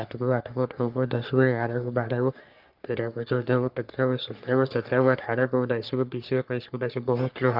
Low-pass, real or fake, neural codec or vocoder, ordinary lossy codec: 5.4 kHz; fake; codec, 44.1 kHz, 3.4 kbps, Pupu-Codec; Opus, 32 kbps